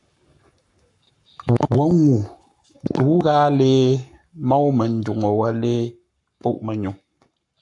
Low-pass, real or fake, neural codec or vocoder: 10.8 kHz; fake; codec, 44.1 kHz, 7.8 kbps, Pupu-Codec